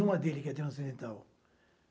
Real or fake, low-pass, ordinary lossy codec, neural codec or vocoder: real; none; none; none